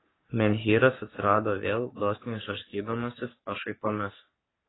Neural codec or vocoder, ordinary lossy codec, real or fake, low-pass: codec, 44.1 kHz, 3.4 kbps, Pupu-Codec; AAC, 16 kbps; fake; 7.2 kHz